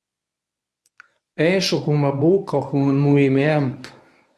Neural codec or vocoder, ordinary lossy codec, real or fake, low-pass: codec, 24 kHz, 0.9 kbps, WavTokenizer, medium speech release version 1; none; fake; none